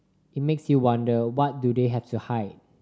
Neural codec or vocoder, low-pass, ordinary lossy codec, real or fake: none; none; none; real